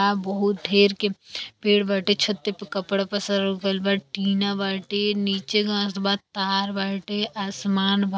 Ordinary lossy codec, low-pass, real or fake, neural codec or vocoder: none; none; real; none